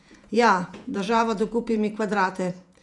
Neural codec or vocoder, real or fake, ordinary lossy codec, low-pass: vocoder, 48 kHz, 128 mel bands, Vocos; fake; none; 10.8 kHz